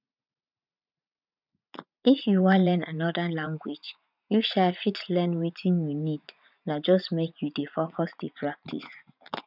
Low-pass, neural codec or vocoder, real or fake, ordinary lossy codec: 5.4 kHz; vocoder, 44.1 kHz, 80 mel bands, Vocos; fake; none